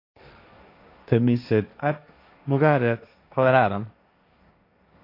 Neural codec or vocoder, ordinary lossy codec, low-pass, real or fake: codec, 16 kHz, 1.1 kbps, Voila-Tokenizer; AAC, 32 kbps; 5.4 kHz; fake